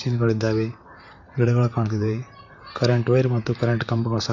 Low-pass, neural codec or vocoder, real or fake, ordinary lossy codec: 7.2 kHz; vocoder, 44.1 kHz, 128 mel bands, Pupu-Vocoder; fake; none